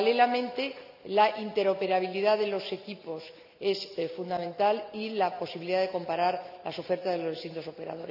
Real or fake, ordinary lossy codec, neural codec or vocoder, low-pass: real; none; none; 5.4 kHz